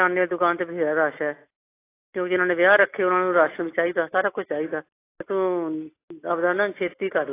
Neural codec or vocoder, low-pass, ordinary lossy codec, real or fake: none; 3.6 kHz; AAC, 24 kbps; real